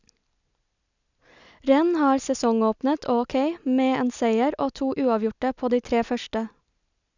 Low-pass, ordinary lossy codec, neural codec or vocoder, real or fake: 7.2 kHz; none; none; real